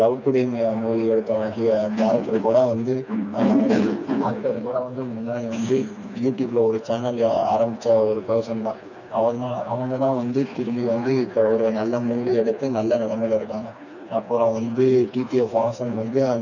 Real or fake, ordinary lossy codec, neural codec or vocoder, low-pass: fake; none; codec, 16 kHz, 2 kbps, FreqCodec, smaller model; 7.2 kHz